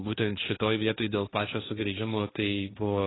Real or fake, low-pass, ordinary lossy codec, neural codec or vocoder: fake; 7.2 kHz; AAC, 16 kbps; codec, 16 kHz, 1.1 kbps, Voila-Tokenizer